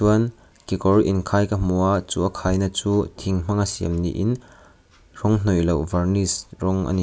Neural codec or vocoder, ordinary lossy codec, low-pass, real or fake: none; none; none; real